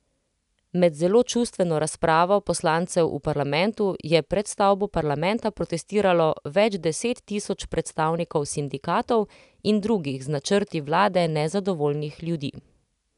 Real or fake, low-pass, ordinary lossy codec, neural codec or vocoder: real; 10.8 kHz; none; none